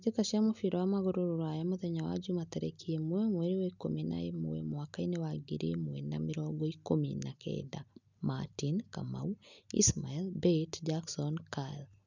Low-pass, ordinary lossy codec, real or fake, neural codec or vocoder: 7.2 kHz; none; real; none